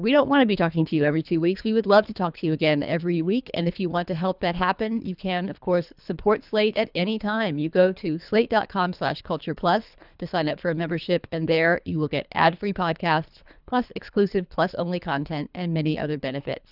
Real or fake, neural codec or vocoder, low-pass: fake; codec, 24 kHz, 3 kbps, HILCodec; 5.4 kHz